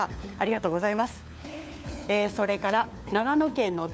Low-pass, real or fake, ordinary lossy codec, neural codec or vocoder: none; fake; none; codec, 16 kHz, 4 kbps, FunCodec, trained on LibriTTS, 50 frames a second